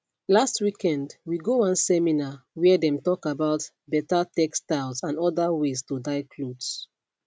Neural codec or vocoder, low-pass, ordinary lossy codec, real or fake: none; none; none; real